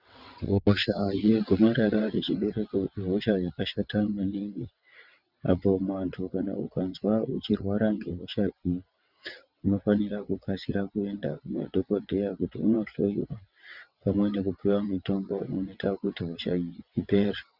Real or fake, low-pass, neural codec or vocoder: fake; 5.4 kHz; vocoder, 22.05 kHz, 80 mel bands, WaveNeXt